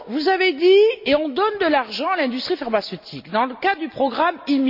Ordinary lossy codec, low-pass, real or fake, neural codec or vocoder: AAC, 48 kbps; 5.4 kHz; real; none